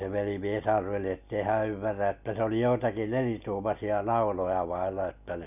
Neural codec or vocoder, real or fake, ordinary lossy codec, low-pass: none; real; none; 3.6 kHz